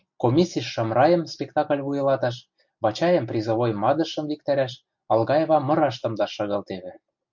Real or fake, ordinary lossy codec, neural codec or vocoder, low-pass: real; MP3, 64 kbps; none; 7.2 kHz